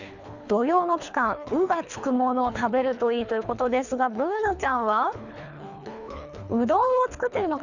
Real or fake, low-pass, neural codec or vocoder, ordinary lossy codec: fake; 7.2 kHz; codec, 24 kHz, 3 kbps, HILCodec; none